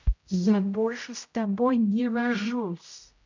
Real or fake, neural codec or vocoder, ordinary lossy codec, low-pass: fake; codec, 16 kHz, 0.5 kbps, X-Codec, HuBERT features, trained on general audio; MP3, 64 kbps; 7.2 kHz